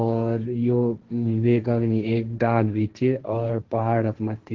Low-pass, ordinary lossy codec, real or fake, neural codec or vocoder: 7.2 kHz; Opus, 16 kbps; fake; codec, 16 kHz, 1.1 kbps, Voila-Tokenizer